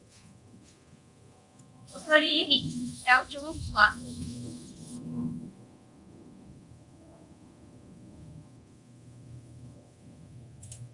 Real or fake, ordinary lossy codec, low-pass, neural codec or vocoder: fake; Opus, 64 kbps; 10.8 kHz; codec, 24 kHz, 0.9 kbps, DualCodec